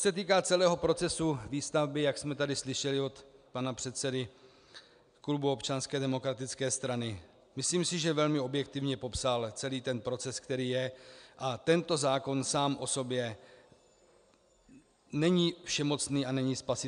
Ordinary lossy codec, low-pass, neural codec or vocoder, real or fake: MP3, 96 kbps; 9.9 kHz; none; real